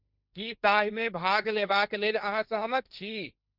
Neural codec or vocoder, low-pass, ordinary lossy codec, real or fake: codec, 16 kHz, 1.1 kbps, Voila-Tokenizer; 5.4 kHz; none; fake